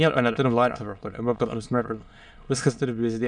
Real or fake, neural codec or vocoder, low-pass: fake; autoencoder, 22.05 kHz, a latent of 192 numbers a frame, VITS, trained on many speakers; 9.9 kHz